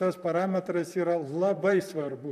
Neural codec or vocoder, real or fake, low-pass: vocoder, 44.1 kHz, 128 mel bands, Pupu-Vocoder; fake; 14.4 kHz